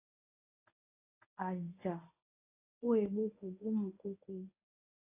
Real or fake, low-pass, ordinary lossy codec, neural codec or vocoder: fake; 3.6 kHz; AAC, 16 kbps; codec, 24 kHz, 0.9 kbps, WavTokenizer, medium speech release version 1